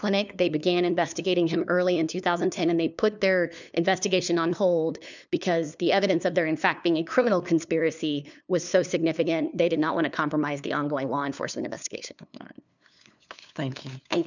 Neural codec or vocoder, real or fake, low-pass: codec, 16 kHz, 2 kbps, FunCodec, trained on LibriTTS, 25 frames a second; fake; 7.2 kHz